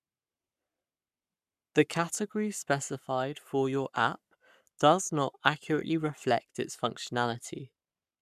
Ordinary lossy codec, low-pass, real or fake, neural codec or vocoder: none; 14.4 kHz; fake; codec, 44.1 kHz, 7.8 kbps, Pupu-Codec